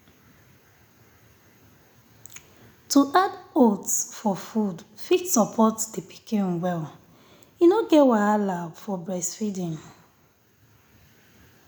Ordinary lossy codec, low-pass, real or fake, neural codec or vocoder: none; none; real; none